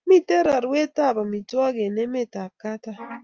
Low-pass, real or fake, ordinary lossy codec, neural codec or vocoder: 7.2 kHz; real; Opus, 24 kbps; none